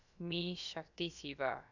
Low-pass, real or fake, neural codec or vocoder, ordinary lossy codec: 7.2 kHz; fake; codec, 16 kHz, about 1 kbps, DyCAST, with the encoder's durations; none